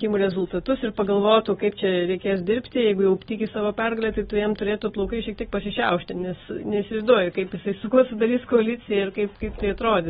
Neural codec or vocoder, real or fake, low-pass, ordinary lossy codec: none; real; 19.8 kHz; AAC, 16 kbps